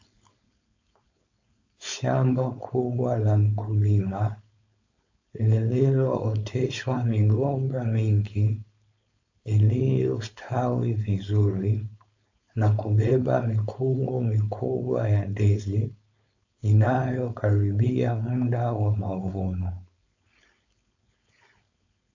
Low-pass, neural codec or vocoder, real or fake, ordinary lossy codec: 7.2 kHz; codec, 16 kHz, 4.8 kbps, FACodec; fake; AAC, 48 kbps